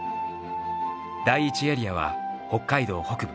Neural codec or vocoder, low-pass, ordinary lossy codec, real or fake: none; none; none; real